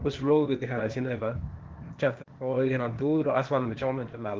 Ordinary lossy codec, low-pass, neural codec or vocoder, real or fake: Opus, 16 kbps; 7.2 kHz; codec, 16 kHz, 0.8 kbps, ZipCodec; fake